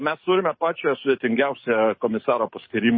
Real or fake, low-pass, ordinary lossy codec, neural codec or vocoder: fake; 7.2 kHz; MP3, 24 kbps; codec, 44.1 kHz, 7.8 kbps, DAC